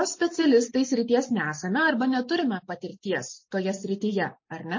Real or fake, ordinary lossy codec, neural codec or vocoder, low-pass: real; MP3, 32 kbps; none; 7.2 kHz